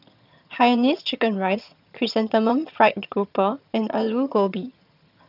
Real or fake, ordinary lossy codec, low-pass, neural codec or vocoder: fake; none; 5.4 kHz; vocoder, 22.05 kHz, 80 mel bands, HiFi-GAN